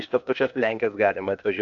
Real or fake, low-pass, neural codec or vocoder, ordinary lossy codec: fake; 7.2 kHz; codec, 16 kHz, 0.8 kbps, ZipCodec; MP3, 64 kbps